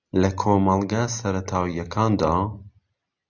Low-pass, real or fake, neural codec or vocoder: 7.2 kHz; real; none